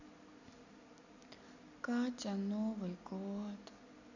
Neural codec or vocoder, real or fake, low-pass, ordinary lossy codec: none; real; 7.2 kHz; none